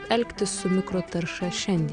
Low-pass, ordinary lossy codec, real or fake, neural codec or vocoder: 9.9 kHz; Opus, 64 kbps; real; none